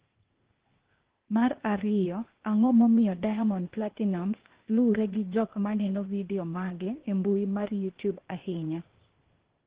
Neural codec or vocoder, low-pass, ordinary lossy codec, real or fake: codec, 16 kHz, 0.8 kbps, ZipCodec; 3.6 kHz; Opus, 16 kbps; fake